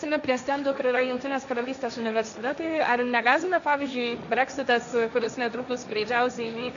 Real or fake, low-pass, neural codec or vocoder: fake; 7.2 kHz; codec, 16 kHz, 1.1 kbps, Voila-Tokenizer